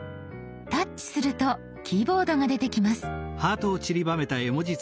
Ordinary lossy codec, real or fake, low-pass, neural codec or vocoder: none; real; none; none